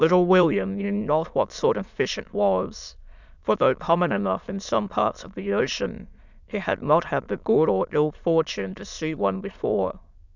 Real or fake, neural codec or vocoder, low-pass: fake; autoencoder, 22.05 kHz, a latent of 192 numbers a frame, VITS, trained on many speakers; 7.2 kHz